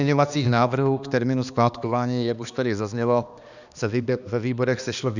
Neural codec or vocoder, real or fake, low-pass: codec, 16 kHz, 2 kbps, X-Codec, HuBERT features, trained on balanced general audio; fake; 7.2 kHz